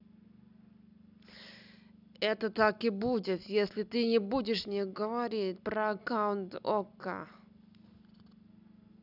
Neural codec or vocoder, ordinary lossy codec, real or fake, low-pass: none; none; real; 5.4 kHz